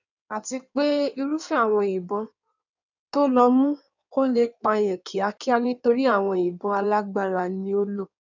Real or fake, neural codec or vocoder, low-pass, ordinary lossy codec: fake; codec, 16 kHz in and 24 kHz out, 1.1 kbps, FireRedTTS-2 codec; 7.2 kHz; none